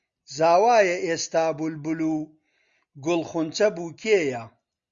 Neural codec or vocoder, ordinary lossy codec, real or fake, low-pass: none; Opus, 64 kbps; real; 7.2 kHz